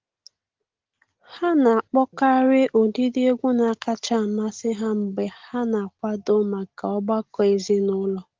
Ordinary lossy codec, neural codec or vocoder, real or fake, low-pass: Opus, 16 kbps; none; real; 7.2 kHz